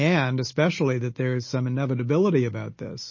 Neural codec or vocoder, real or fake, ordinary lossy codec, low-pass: none; real; MP3, 32 kbps; 7.2 kHz